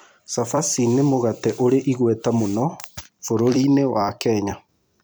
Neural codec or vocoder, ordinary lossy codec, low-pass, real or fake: vocoder, 44.1 kHz, 128 mel bands every 256 samples, BigVGAN v2; none; none; fake